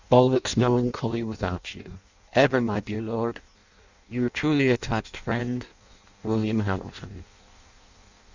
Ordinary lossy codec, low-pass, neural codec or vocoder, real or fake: Opus, 64 kbps; 7.2 kHz; codec, 16 kHz in and 24 kHz out, 0.6 kbps, FireRedTTS-2 codec; fake